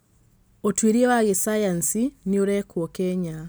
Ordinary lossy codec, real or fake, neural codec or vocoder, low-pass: none; real; none; none